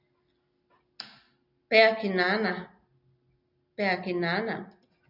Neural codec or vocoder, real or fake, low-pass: none; real; 5.4 kHz